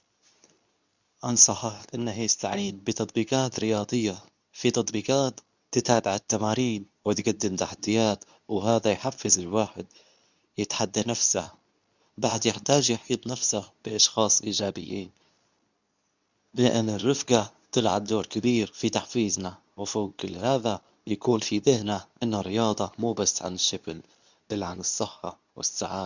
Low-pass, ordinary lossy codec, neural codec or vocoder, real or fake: 7.2 kHz; none; codec, 24 kHz, 0.9 kbps, WavTokenizer, medium speech release version 2; fake